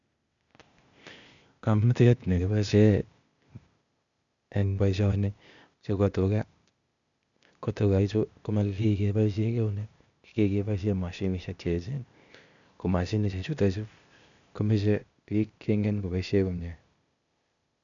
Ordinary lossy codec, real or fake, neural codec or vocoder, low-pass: none; fake; codec, 16 kHz, 0.8 kbps, ZipCodec; 7.2 kHz